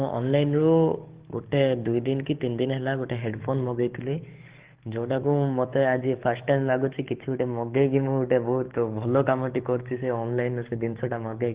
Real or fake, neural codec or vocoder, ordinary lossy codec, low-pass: fake; codec, 44.1 kHz, 7.8 kbps, DAC; Opus, 16 kbps; 3.6 kHz